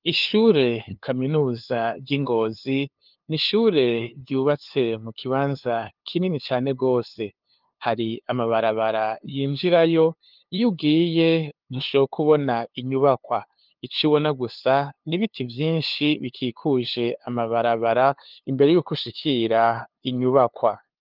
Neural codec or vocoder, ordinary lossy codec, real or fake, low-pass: codec, 16 kHz, 2 kbps, FunCodec, trained on LibriTTS, 25 frames a second; Opus, 24 kbps; fake; 5.4 kHz